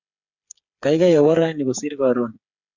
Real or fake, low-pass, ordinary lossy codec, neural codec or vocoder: fake; 7.2 kHz; Opus, 64 kbps; codec, 16 kHz, 8 kbps, FreqCodec, smaller model